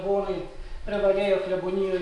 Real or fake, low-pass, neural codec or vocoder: real; 10.8 kHz; none